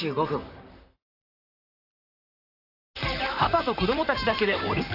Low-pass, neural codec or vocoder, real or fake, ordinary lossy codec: 5.4 kHz; vocoder, 44.1 kHz, 128 mel bands, Pupu-Vocoder; fake; AAC, 32 kbps